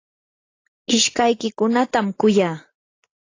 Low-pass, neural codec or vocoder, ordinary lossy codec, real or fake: 7.2 kHz; none; AAC, 32 kbps; real